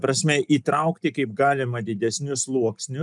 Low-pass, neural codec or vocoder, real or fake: 10.8 kHz; none; real